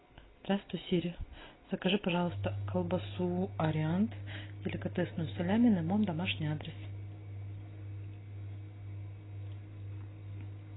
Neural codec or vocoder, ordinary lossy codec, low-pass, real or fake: none; AAC, 16 kbps; 7.2 kHz; real